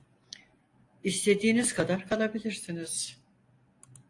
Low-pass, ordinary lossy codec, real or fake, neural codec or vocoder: 10.8 kHz; AAC, 48 kbps; real; none